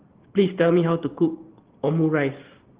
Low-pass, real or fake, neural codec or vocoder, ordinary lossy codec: 3.6 kHz; real; none; Opus, 16 kbps